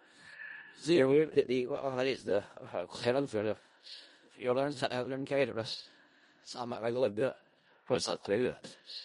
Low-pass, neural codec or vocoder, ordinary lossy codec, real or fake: 10.8 kHz; codec, 16 kHz in and 24 kHz out, 0.4 kbps, LongCat-Audio-Codec, four codebook decoder; MP3, 48 kbps; fake